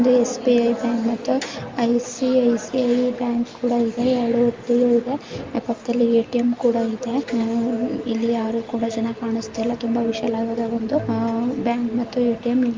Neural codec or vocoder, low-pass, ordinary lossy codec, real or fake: none; 7.2 kHz; Opus, 32 kbps; real